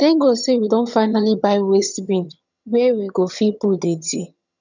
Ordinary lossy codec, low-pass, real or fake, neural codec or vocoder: none; 7.2 kHz; fake; vocoder, 22.05 kHz, 80 mel bands, HiFi-GAN